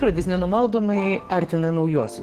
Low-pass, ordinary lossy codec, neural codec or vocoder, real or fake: 14.4 kHz; Opus, 16 kbps; codec, 44.1 kHz, 2.6 kbps, SNAC; fake